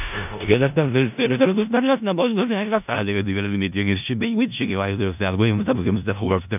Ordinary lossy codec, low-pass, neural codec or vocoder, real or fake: none; 3.6 kHz; codec, 16 kHz in and 24 kHz out, 0.4 kbps, LongCat-Audio-Codec, four codebook decoder; fake